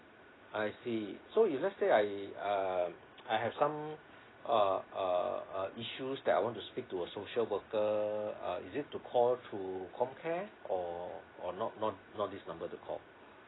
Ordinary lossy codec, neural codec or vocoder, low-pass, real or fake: AAC, 16 kbps; none; 7.2 kHz; real